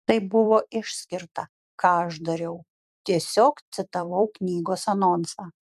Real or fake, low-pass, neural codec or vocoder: fake; 14.4 kHz; vocoder, 44.1 kHz, 128 mel bands every 256 samples, BigVGAN v2